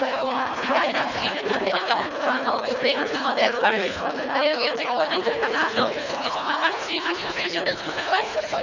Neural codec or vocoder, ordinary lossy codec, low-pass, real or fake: codec, 24 kHz, 1.5 kbps, HILCodec; none; 7.2 kHz; fake